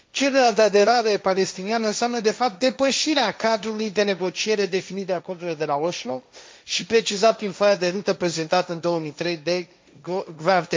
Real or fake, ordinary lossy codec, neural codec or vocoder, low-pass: fake; none; codec, 16 kHz, 1.1 kbps, Voila-Tokenizer; none